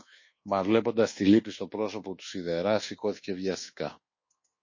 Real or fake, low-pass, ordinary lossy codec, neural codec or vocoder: fake; 7.2 kHz; MP3, 32 kbps; codec, 24 kHz, 1.2 kbps, DualCodec